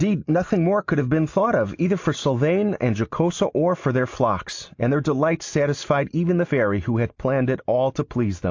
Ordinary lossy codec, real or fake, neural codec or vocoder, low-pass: AAC, 48 kbps; real; none; 7.2 kHz